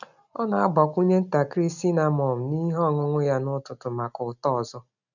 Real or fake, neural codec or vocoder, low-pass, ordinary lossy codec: real; none; 7.2 kHz; none